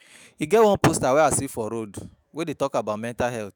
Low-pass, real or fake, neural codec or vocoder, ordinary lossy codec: none; fake; autoencoder, 48 kHz, 128 numbers a frame, DAC-VAE, trained on Japanese speech; none